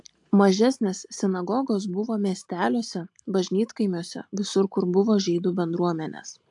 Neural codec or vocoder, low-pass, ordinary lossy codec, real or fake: none; 10.8 kHz; AAC, 64 kbps; real